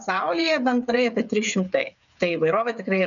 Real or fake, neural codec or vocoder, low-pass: fake; codec, 16 kHz, 8 kbps, FreqCodec, smaller model; 7.2 kHz